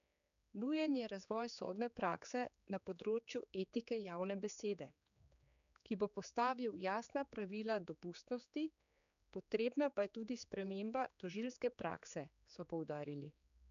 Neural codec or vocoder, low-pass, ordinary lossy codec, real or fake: codec, 16 kHz, 4 kbps, X-Codec, HuBERT features, trained on general audio; 7.2 kHz; none; fake